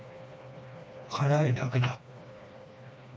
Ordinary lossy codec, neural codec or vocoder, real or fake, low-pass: none; codec, 16 kHz, 2 kbps, FreqCodec, smaller model; fake; none